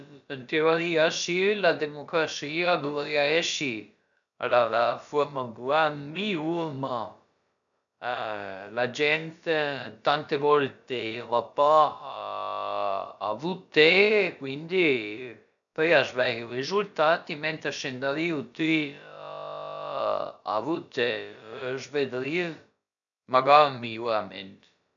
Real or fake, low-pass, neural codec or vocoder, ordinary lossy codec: fake; 7.2 kHz; codec, 16 kHz, about 1 kbps, DyCAST, with the encoder's durations; none